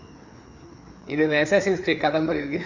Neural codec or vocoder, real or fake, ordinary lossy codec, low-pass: codec, 16 kHz, 4 kbps, FreqCodec, larger model; fake; none; 7.2 kHz